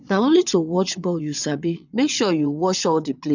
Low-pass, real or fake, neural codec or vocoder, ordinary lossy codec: 7.2 kHz; fake; vocoder, 22.05 kHz, 80 mel bands, WaveNeXt; none